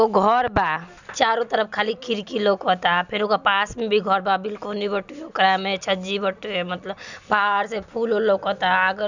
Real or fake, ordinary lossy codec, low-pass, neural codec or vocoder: real; none; 7.2 kHz; none